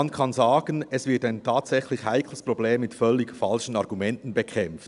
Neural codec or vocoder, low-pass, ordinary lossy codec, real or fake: none; 10.8 kHz; none; real